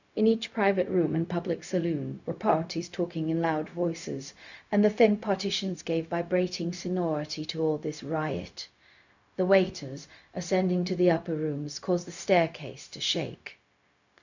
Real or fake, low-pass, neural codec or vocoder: fake; 7.2 kHz; codec, 16 kHz, 0.4 kbps, LongCat-Audio-Codec